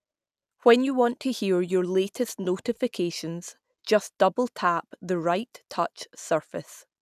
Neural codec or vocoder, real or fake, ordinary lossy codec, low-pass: none; real; none; 14.4 kHz